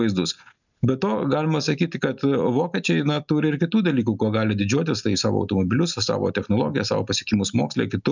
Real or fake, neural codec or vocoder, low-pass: real; none; 7.2 kHz